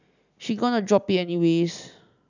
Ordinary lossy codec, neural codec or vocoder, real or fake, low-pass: none; none; real; 7.2 kHz